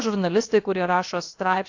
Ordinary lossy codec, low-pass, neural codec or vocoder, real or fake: AAC, 48 kbps; 7.2 kHz; codec, 16 kHz, about 1 kbps, DyCAST, with the encoder's durations; fake